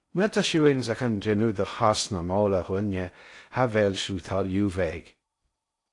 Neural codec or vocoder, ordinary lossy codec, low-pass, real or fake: codec, 16 kHz in and 24 kHz out, 0.6 kbps, FocalCodec, streaming, 4096 codes; AAC, 48 kbps; 10.8 kHz; fake